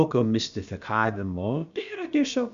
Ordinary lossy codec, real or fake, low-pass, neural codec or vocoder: Opus, 64 kbps; fake; 7.2 kHz; codec, 16 kHz, about 1 kbps, DyCAST, with the encoder's durations